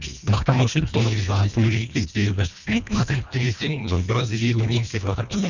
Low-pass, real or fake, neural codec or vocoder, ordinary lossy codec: 7.2 kHz; fake; codec, 24 kHz, 1.5 kbps, HILCodec; none